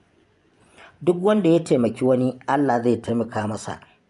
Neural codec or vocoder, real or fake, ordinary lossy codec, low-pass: vocoder, 24 kHz, 100 mel bands, Vocos; fake; none; 10.8 kHz